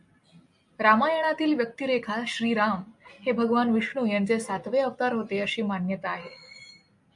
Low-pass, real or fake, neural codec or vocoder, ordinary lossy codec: 10.8 kHz; real; none; MP3, 64 kbps